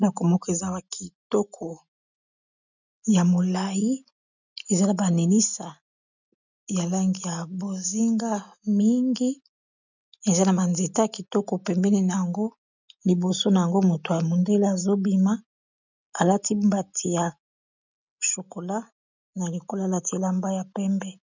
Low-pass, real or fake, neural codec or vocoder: 7.2 kHz; real; none